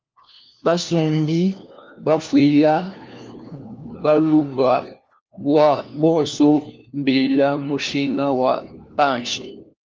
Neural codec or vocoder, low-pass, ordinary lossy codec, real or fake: codec, 16 kHz, 1 kbps, FunCodec, trained on LibriTTS, 50 frames a second; 7.2 kHz; Opus, 24 kbps; fake